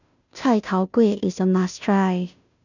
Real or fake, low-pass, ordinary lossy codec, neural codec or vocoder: fake; 7.2 kHz; none; codec, 16 kHz, 0.5 kbps, FunCodec, trained on Chinese and English, 25 frames a second